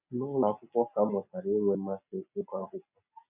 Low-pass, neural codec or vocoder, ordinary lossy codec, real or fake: 3.6 kHz; none; none; real